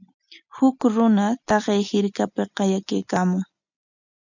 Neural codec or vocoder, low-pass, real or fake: none; 7.2 kHz; real